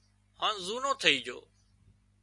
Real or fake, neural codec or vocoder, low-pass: real; none; 10.8 kHz